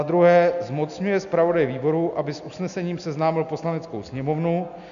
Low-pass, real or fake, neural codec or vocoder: 7.2 kHz; real; none